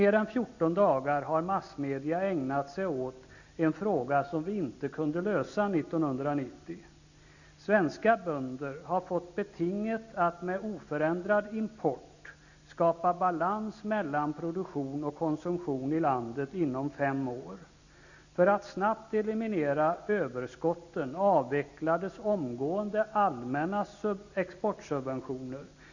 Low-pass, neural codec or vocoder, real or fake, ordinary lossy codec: 7.2 kHz; none; real; none